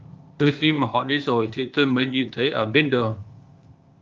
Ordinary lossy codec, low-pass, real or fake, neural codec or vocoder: Opus, 32 kbps; 7.2 kHz; fake; codec, 16 kHz, 0.8 kbps, ZipCodec